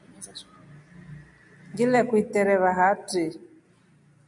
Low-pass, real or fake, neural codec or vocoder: 10.8 kHz; real; none